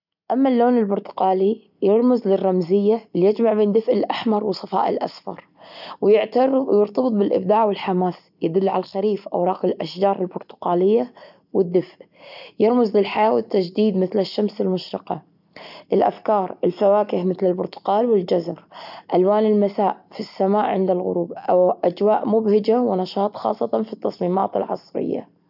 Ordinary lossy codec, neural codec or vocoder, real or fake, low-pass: none; none; real; 5.4 kHz